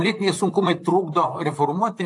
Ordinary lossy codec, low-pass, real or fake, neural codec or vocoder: AAC, 64 kbps; 10.8 kHz; fake; vocoder, 44.1 kHz, 128 mel bands, Pupu-Vocoder